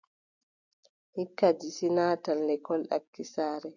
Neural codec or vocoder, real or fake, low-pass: none; real; 7.2 kHz